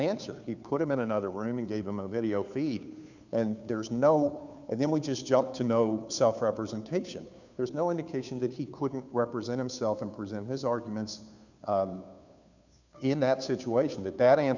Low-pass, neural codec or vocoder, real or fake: 7.2 kHz; codec, 16 kHz, 2 kbps, FunCodec, trained on Chinese and English, 25 frames a second; fake